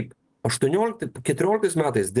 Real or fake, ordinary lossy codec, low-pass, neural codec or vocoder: real; Opus, 24 kbps; 10.8 kHz; none